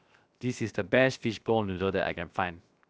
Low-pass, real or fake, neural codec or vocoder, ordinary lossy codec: none; fake; codec, 16 kHz, 0.7 kbps, FocalCodec; none